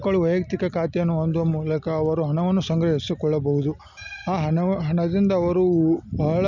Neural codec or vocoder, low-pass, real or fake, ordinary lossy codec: none; 7.2 kHz; real; none